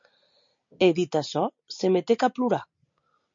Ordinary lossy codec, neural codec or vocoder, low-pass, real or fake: MP3, 48 kbps; none; 7.2 kHz; real